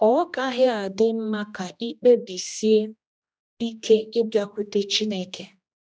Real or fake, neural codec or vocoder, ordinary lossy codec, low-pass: fake; codec, 16 kHz, 1 kbps, X-Codec, HuBERT features, trained on general audio; none; none